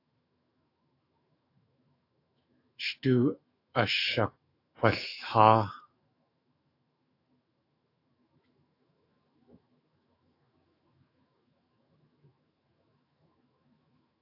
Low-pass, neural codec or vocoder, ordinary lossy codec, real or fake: 5.4 kHz; autoencoder, 48 kHz, 128 numbers a frame, DAC-VAE, trained on Japanese speech; AAC, 32 kbps; fake